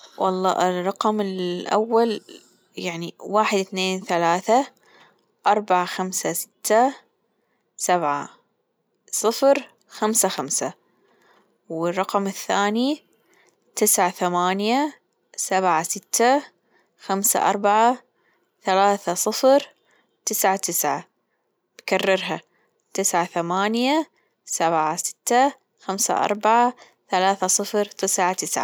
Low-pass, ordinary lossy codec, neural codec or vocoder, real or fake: none; none; none; real